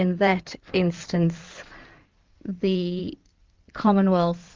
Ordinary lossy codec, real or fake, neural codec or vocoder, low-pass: Opus, 24 kbps; fake; codec, 16 kHz in and 24 kHz out, 2.2 kbps, FireRedTTS-2 codec; 7.2 kHz